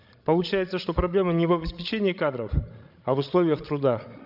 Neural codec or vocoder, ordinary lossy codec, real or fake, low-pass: codec, 16 kHz, 16 kbps, FreqCodec, larger model; none; fake; 5.4 kHz